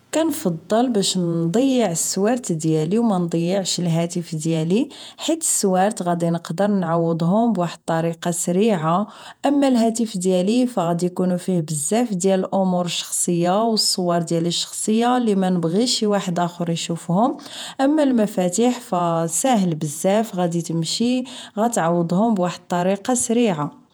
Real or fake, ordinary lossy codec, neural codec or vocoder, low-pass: fake; none; vocoder, 48 kHz, 128 mel bands, Vocos; none